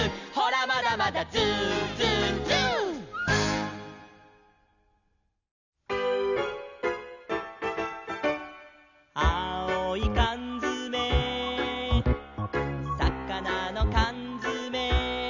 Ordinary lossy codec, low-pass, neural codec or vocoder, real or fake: none; 7.2 kHz; none; real